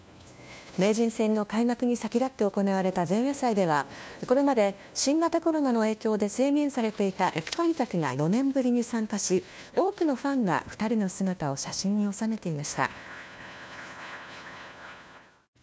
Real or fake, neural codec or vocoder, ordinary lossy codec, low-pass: fake; codec, 16 kHz, 1 kbps, FunCodec, trained on LibriTTS, 50 frames a second; none; none